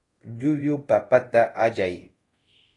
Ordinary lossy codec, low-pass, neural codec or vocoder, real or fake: AAC, 32 kbps; 10.8 kHz; codec, 24 kHz, 0.5 kbps, DualCodec; fake